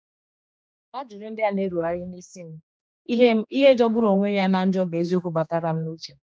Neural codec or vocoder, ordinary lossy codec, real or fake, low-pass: codec, 16 kHz, 2 kbps, X-Codec, HuBERT features, trained on general audio; none; fake; none